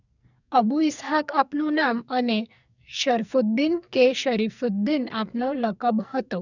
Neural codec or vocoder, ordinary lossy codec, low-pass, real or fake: codec, 44.1 kHz, 2.6 kbps, SNAC; none; 7.2 kHz; fake